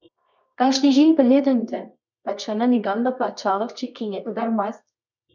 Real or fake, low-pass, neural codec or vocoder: fake; 7.2 kHz; codec, 24 kHz, 0.9 kbps, WavTokenizer, medium music audio release